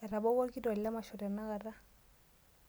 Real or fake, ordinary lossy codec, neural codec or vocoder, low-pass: real; none; none; none